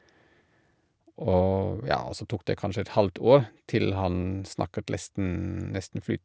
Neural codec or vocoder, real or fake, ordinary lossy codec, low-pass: none; real; none; none